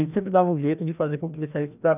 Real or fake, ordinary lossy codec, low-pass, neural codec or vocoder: fake; none; 3.6 kHz; codec, 16 kHz, 1 kbps, FreqCodec, larger model